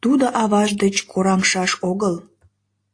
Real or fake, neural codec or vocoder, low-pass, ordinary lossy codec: real; none; 9.9 kHz; AAC, 48 kbps